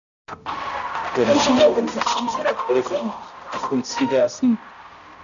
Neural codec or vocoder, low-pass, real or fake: codec, 16 kHz, 0.5 kbps, X-Codec, HuBERT features, trained on balanced general audio; 7.2 kHz; fake